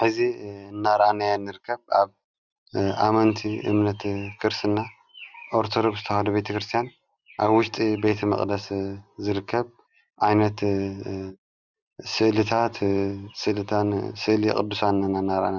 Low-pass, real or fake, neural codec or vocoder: 7.2 kHz; real; none